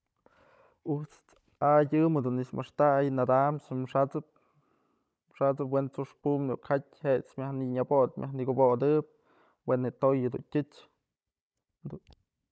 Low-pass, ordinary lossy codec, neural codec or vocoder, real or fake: none; none; codec, 16 kHz, 16 kbps, FunCodec, trained on Chinese and English, 50 frames a second; fake